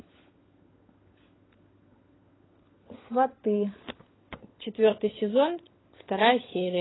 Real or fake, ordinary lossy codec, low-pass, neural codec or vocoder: fake; AAC, 16 kbps; 7.2 kHz; codec, 16 kHz, 16 kbps, FunCodec, trained on LibriTTS, 50 frames a second